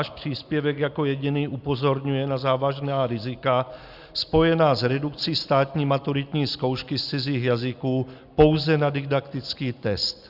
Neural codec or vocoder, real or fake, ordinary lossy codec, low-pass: none; real; Opus, 64 kbps; 5.4 kHz